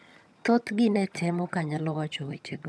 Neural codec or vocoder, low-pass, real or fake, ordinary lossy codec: vocoder, 22.05 kHz, 80 mel bands, HiFi-GAN; none; fake; none